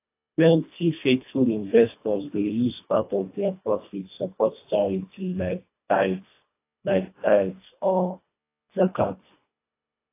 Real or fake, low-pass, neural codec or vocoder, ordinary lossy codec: fake; 3.6 kHz; codec, 24 kHz, 1.5 kbps, HILCodec; AAC, 24 kbps